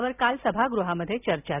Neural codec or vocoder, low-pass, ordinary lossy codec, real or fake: none; 3.6 kHz; none; real